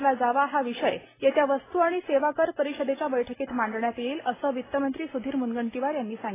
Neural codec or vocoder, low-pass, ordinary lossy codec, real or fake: none; 3.6 kHz; AAC, 16 kbps; real